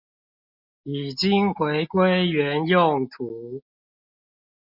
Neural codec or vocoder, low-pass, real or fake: none; 5.4 kHz; real